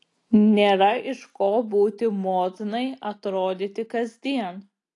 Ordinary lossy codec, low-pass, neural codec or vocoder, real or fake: AAC, 48 kbps; 10.8 kHz; none; real